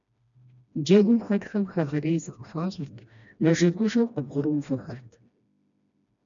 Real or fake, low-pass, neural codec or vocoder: fake; 7.2 kHz; codec, 16 kHz, 1 kbps, FreqCodec, smaller model